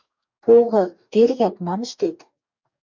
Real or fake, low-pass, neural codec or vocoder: fake; 7.2 kHz; codec, 44.1 kHz, 2.6 kbps, DAC